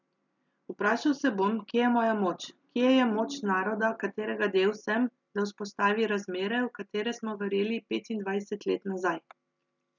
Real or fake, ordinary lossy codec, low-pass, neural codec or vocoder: real; none; 7.2 kHz; none